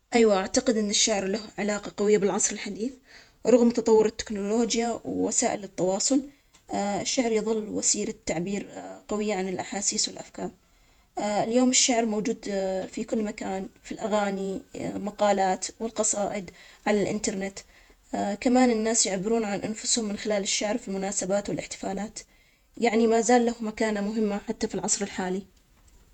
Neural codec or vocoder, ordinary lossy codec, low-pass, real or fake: vocoder, 48 kHz, 128 mel bands, Vocos; none; 19.8 kHz; fake